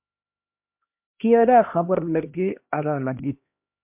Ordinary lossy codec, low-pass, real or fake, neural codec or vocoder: MP3, 32 kbps; 3.6 kHz; fake; codec, 16 kHz, 1 kbps, X-Codec, HuBERT features, trained on LibriSpeech